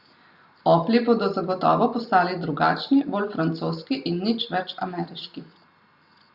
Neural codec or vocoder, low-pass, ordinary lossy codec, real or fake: none; 5.4 kHz; Opus, 64 kbps; real